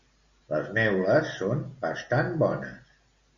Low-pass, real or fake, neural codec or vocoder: 7.2 kHz; real; none